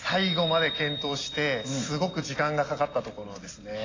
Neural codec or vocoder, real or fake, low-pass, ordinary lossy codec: none; real; 7.2 kHz; AAC, 32 kbps